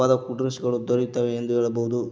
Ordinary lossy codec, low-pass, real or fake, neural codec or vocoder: none; none; real; none